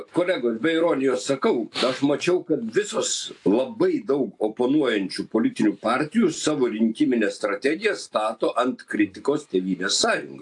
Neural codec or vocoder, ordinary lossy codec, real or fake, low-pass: none; AAC, 64 kbps; real; 10.8 kHz